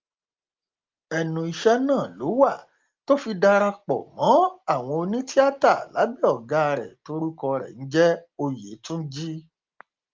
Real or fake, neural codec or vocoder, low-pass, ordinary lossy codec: real; none; 7.2 kHz; Opus, 32 kbps